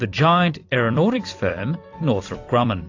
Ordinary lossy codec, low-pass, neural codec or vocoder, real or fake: AAC, 48 kbps; 7.2 kHz; vocoder, 44.1 kHz, 128 mel bands every 256 samples, BigVGAN v2; fake